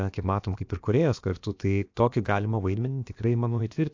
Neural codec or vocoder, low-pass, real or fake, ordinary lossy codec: codec, 16 kHz, about 1 kbps, DyCAST, with the encoder's durations; 7.2 kHz; fake; AAC, 48 kbps